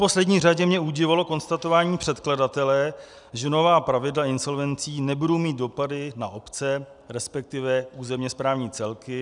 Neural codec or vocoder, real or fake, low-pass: none; real; 10.8 kHz